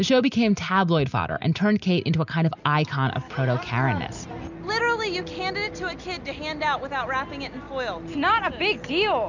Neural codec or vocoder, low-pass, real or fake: none; 7.2 kHz; real